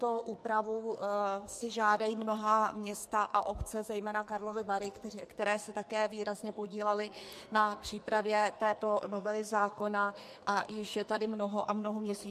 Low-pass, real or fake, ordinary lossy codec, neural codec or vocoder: 14.4 kHz; fake; MP3, 64 kbps; codec, 44.1 kHz, 2.6 kbps, SNAC